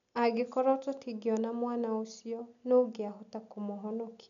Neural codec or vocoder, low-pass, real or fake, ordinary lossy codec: none; 7.2 kHz; real; none